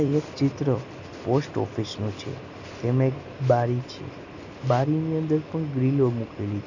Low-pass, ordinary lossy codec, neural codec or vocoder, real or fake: 7.2 kHz; none; none; real